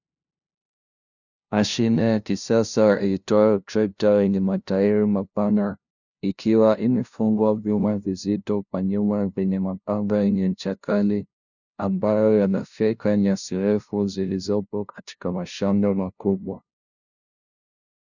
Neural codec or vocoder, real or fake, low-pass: codec, 16 kHz, 0.5 kbps, FunCodec, trained on LibriTTS, 25 frames a second; fake; 7.2 kHz